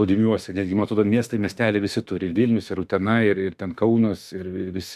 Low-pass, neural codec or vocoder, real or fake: 14.4 kHz; autoencoder, 48 kHz, 32 numbers a frame, DAC-VAE, trained on Japanese speech; fake